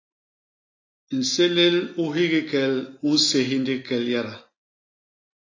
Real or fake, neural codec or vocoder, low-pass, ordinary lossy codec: real; none; 7.2 kHz; AAC, 32 kbps